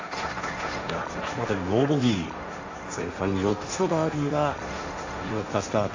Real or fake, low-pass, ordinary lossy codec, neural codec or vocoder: fake; 7.2 kHz; AAC, 48 kbps; codec, 16 kHz, 1.1 kbps, Voila-Tokenizer